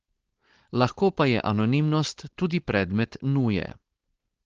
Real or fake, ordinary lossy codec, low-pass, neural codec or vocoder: fake; Opus, 16 kbps; 7.2 kHz; codec, 16 kHz, 4.8 kbps, FACodec